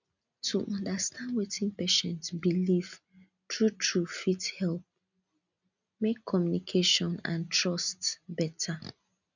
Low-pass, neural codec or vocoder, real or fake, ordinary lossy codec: 7.2 kHz; none; real; none